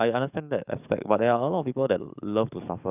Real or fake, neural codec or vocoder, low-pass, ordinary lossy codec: fake; codec, 44.1 kHz, 7.8 kbps, DAC; 3.6 kHz; none